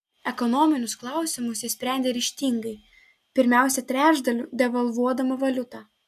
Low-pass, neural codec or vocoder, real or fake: 14.4 kHz; none; real